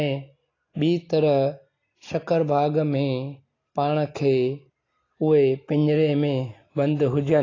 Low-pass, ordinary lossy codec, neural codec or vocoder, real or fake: 7.2 kHz; AAC, 32 kbps; none; real